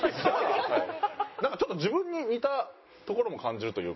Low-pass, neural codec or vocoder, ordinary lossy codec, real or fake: 7.2 kHz; none; MP3, 24 kbps; real